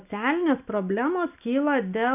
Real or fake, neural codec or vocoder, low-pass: real; none; 3.6 kHz